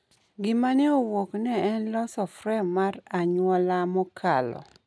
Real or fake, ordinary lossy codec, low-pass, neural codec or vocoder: real; none; none; none